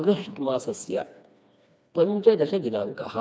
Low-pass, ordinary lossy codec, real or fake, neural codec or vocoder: none; none; fake; codec, 16 kHz, 2 kbps, FreqCodec, smaller model